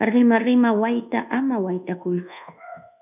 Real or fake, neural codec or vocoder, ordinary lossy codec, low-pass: fake; codec, 24 kHz, 1.2 kbps, DualCodec; AAC, 32 kbps; 3.6 kHz